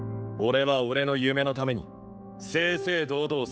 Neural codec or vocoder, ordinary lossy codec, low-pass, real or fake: codec, 16 kHz, 4 kbps, X-Codec, HuBERT features, trained on general audio; none; none; fake